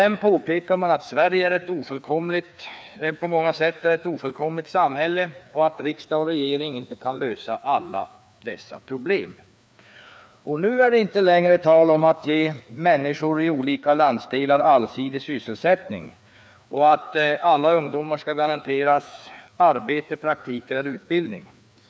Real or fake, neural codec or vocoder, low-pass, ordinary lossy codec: fake; codec, 16 kHz, 2 kbps, FreqCodec, larger model; none; none